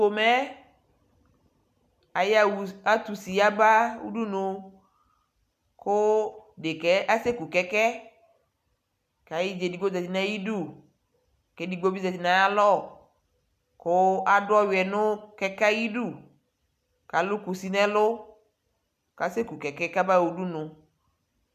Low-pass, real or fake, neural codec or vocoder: 14.4 kHz; real; none